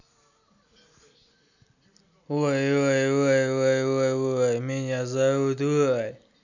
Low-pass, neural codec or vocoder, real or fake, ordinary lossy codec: 7.2 kHz; none; real; none